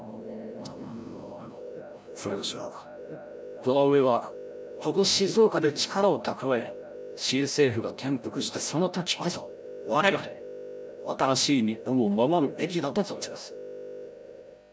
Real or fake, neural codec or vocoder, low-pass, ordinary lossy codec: fake; codec, 16 kHz, 0.5 kbps, FreqCodec, larger model; none; none